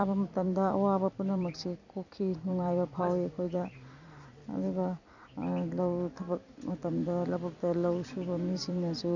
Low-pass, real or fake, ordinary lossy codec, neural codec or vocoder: 7.2 kHz; fake; none; vocoder, 44.1 kHz, 128 mel bands every 256 samples, BigVGAN v2